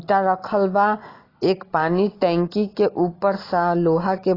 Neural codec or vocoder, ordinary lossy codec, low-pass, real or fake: none; AAC, 24 kbps; 5.4 kHz; real